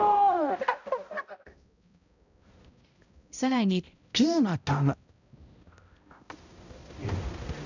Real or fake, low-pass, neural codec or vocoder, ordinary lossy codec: fake; 7.2 kHz; codec, 16 kHz, 0.5 kbps, X-Codec, HuBERT features, trained on balanced general audio; none